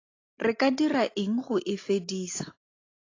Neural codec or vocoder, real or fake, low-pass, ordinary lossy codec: none; real; 7.2 kHz; AAC, 32 kbps